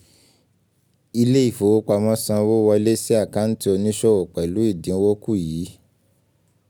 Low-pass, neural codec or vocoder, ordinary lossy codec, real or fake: none; none; none; real